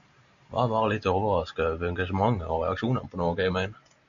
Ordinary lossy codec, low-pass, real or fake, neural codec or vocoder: MP3, 96 kbps; 7.2 kHz; real; none